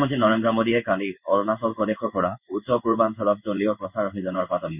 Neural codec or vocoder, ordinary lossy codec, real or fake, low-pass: codec, 16 kHz in and 24 kHz out, 1 kbps, XY-Tokenizer; none; fake; 3.6 kHz